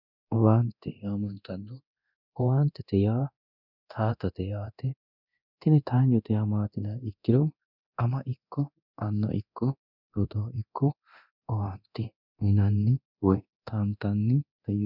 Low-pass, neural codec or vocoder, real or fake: 5.4 kHz; codec, 24 kHz, 0.9 kbps, DualCodec; fake